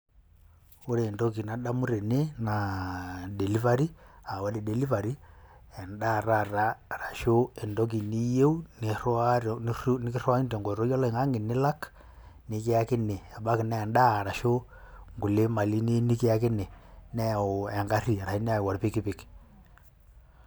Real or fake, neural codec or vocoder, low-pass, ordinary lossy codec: real; none; none; none